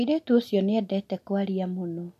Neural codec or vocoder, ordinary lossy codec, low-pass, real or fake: none; MP3, 64 kbps; 14.4 kHz; real